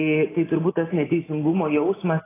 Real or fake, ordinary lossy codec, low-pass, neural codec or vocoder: real; AAC, 16 kbps; 3.6 kHz; none